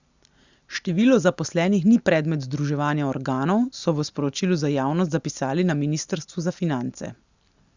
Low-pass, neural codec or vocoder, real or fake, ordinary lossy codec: 7.2 kHz; none; real; Opus, 64 kbps